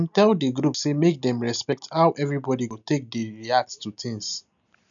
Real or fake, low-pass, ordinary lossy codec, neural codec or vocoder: real; 7.2 kHz; none; none